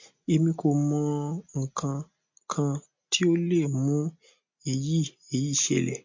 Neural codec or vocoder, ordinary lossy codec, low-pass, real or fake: none; MP3, 48 kbps; 7.2 kHz; real